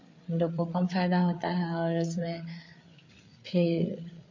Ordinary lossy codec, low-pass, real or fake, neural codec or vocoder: MP3, 32 kbps; 7.2 kHz; fake; codec, 16 kHz, 8 kbps, FreqCodec, larger model